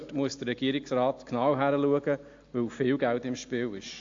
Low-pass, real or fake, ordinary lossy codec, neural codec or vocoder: 7.2 kHz; real; AAC, 48 kbps; none